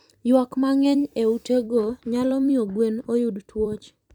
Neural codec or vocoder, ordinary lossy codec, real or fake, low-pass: vocoder, 44.1 kHz, 128 mel bands every 256 samples, BigVGAN v2; none; fake; 19.8 kHz